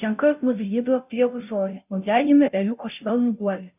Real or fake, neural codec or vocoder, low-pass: fake; codec, 16 kHz, 0.5 kbps, FunCodec, trained on Chinese and English, 25 frames a second; 3.6 kHz